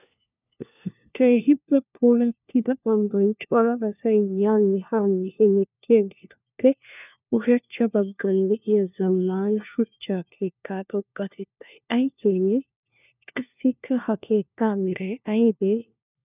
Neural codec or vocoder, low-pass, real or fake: codec, 16 kHz, 1 kbps, FunCodec, trained on LibriTTS, 50 frames a second; 3.6 kHz; fake